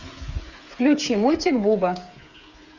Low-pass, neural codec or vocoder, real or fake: 7.2 kHz; codec, 16 kHz, 8 kbps, FreqCodec, smaller model; fake